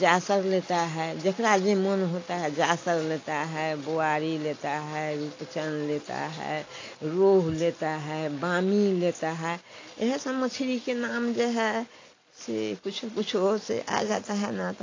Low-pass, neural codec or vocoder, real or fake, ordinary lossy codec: 7.2 kHz; none; real; AAC, 32 kbps